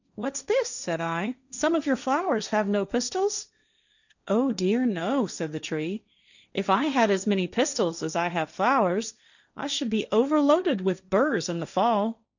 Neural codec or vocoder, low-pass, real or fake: codec, 16 kHz, 1.1 kbps, Voila-Tokenizer; 7.2 kHz; fake